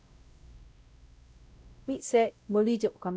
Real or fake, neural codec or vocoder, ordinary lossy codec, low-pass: fake; codec, 16 kHz, 0.5 kbps, X-Codec, WavLM features, trained on Multilingual LibriSpeech; none; none